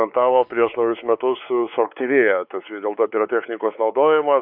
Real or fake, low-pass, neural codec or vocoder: fake; 5.4 kHz; codec, 16 kHz, 4 kbps, X-Codec, WavLM features, trained on Multilingual LibriSpeech